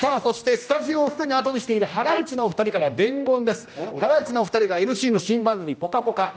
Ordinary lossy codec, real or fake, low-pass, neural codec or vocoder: none; fake; none; codec, 16 kHz, 1 kbps, X-Codec, HuBERT features, trained on general audio